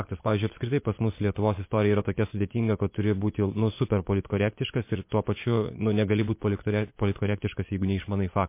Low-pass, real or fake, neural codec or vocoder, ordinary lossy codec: 3.6 kHz; fake; vocoder, 24 kHz, 100 mel bands, Vocos; MP3, 24 kbps